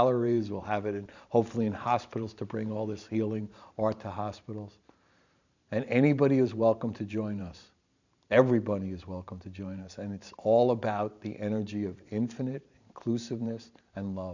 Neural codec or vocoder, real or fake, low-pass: vocoder, 44.1 kHz, 128 mel bands every 512 samples, BigVGAN v2; fake; 7.2 kHz